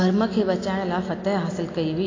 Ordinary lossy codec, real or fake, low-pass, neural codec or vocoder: AAC, 32 kbps; real; 7.2 kHz; none